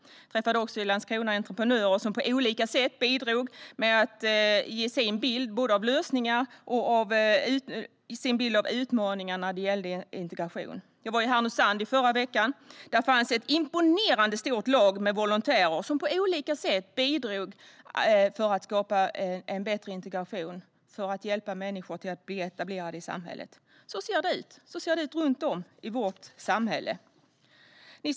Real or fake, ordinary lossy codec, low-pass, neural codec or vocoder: real; none; none; none